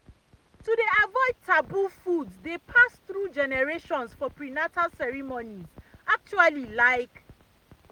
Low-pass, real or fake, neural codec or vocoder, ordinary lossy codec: 19.8 kHz; real; none; Opus, 32 kbps